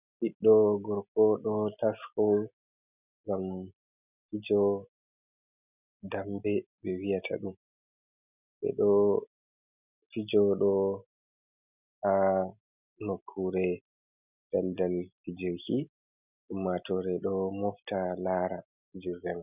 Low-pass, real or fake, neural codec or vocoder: 3.6 kHz; real; none